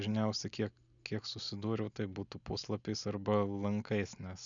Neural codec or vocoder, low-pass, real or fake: none; 7.2 kHz; real